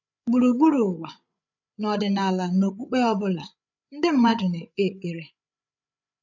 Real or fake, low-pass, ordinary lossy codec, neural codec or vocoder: fake; 7.2 kHz; none; codec, 16 kHz, 8 kbps, FreqCodec, larger model